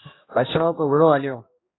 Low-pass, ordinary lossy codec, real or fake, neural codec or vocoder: 7.2 kHz; AAC, 16 kbps; fake; codec, 16 kHz, 1 kbps, X-Codec, HuBERT features, trained on general audio